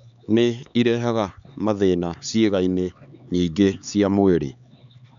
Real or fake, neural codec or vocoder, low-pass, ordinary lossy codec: fake; codec, 16 kHz, 4 kbps, X-Codec, HuBERT features, trained on LibriSpeech; 7.2 kHz; none